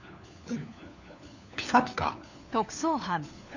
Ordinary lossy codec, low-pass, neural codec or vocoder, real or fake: none; 7.2 kHz; codec, 16 kHz, 4 kbps, FunCodec, trained on LibriTTS, 50 frames a second; fake